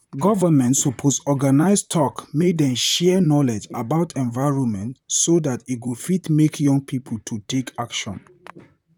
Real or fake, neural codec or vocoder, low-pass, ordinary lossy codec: fake; vocoder, 44.1 kHz, 128 mel bands, Pupu-Vocoder; 19.8 kHz; none